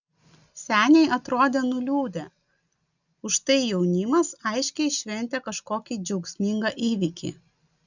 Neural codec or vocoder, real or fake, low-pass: none; real; 7.2 kHz